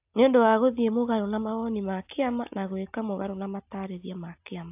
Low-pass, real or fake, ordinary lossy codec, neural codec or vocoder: 3.6 kHz; real; AAC, 32 kbps; none